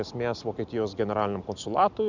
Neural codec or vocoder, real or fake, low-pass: none; real; 7.2 kHz